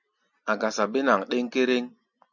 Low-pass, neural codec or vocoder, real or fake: 7.2 kHz; none; real